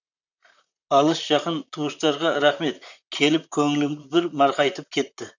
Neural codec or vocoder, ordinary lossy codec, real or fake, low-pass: vocoder, 44.1 kHz, 128 mel bands, Pupu-Vocoder; none; fake; 7.2 kHz